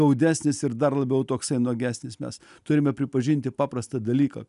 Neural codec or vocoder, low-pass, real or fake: none; 10.8 kHz; real